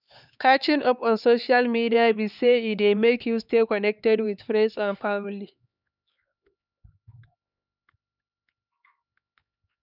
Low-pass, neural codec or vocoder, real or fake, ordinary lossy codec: 5.4 kHz; codec, 16 kHz, 4 kbps, X-Codec, HuBERT features, trained on LibriSpeech; fake; none